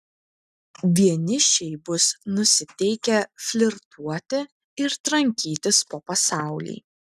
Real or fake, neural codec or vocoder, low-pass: real; none; 14.4 kHz